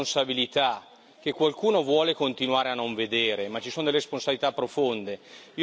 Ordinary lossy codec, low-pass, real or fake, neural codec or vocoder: none; none; real; none